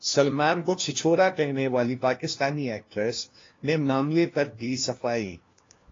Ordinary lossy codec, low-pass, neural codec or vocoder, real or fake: AAC, 32 kbps; 7.2 kHz; codec, 16 kHz, 1 kbps, FunCodec, trained on LibriTTS, 50 frames a second; fake